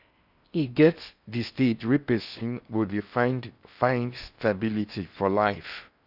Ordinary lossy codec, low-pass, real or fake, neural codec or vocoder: none; 5.4 kHz; fake; codec, 16 kHz in and 24 kHz out, 0.6 kbps, FocalCodec, streaming, 4096 codes